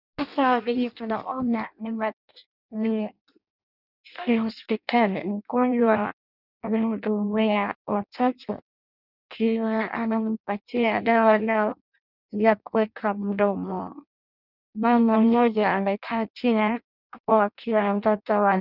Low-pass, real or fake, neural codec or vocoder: 5.4 kHz; fake; codec, 16 kHz in and 24 kHz out, 0.6 kbps, FireRedTTS-2 codec